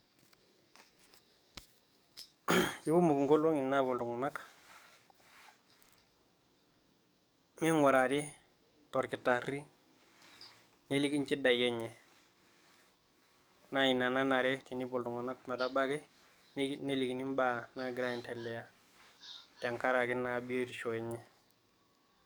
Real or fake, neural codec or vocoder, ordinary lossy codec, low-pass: fake; codec, 44.1 kHz, 7.8 kbps, DAC; none; none